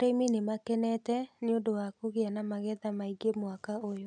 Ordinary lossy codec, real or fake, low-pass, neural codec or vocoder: none; real; 9.9 kHz; none